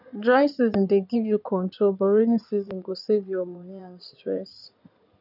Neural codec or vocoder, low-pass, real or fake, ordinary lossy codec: codec, 16 kHz in and 24 kHz out, 2.2 kbps, FireRedTTS-2 codec; 5.4 kHz; fake; none